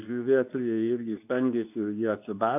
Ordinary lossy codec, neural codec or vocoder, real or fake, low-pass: AAC, 32 kbps; codec, 24 kHz, 0.9 kbps, WavTokenizer, medium speech release version 2; fake; 3.6 kHz